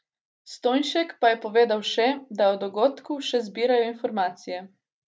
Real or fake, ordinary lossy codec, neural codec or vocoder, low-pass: real; none; none; none